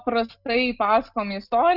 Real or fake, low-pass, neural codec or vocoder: real; 5.4 kHz; none